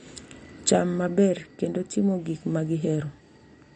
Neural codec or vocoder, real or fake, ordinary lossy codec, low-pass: none; real; MP3, 48 kbps; 9.9 kHz